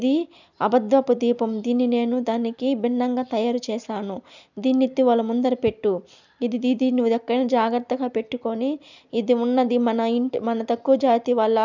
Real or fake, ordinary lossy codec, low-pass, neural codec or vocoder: real; none; 7.2 kHz; none